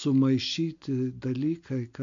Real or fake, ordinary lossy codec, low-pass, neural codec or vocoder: real; AAC, 64 kbps; 7.2 kHz; none